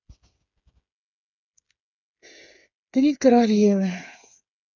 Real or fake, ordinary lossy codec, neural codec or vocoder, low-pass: fake; none; codec, 16 kHz, 8 kbps, FreqCodec, smaller model; 7.2 kHz